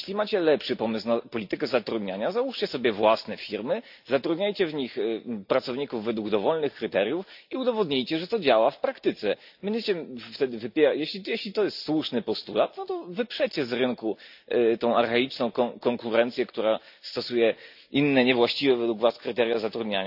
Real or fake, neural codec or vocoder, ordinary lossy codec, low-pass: real; none; AAC, 48 kbps; 5.4 kHz